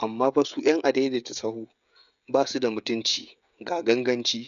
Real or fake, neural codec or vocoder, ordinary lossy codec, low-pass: fake; codec, 16 kHz, 16 kbps, FreqCodec, smaller model; none; 7.2 kHz